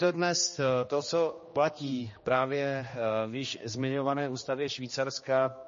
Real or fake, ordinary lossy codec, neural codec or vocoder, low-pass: fake; MP3, 32 kbps; codec, 16 kHz, 2 kbps, X-Codec, HuBERT features, trained on general audio; 7.2 kHz